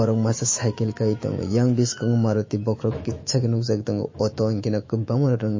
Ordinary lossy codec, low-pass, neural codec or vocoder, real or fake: MP3, 32 kbps; 7.2 kHz; autoencoder, 48 kHz, 128 numbers a frame, DAC-VAE, trained on Japanese speech; fake